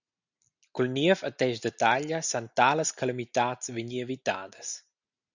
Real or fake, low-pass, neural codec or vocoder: real; 7.2 kHz; none